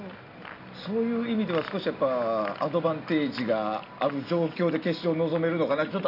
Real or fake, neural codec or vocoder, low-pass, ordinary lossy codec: real; none; 5.4 kHz; none